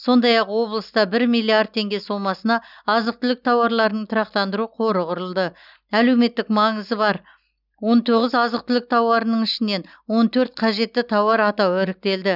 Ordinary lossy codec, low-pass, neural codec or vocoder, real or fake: none; 5.4 kHz; none; real